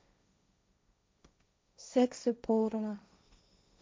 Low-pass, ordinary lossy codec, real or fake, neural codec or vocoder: none; none; fake; codec, 16 kHz, 1.1 kbps, Voila-Tokenizer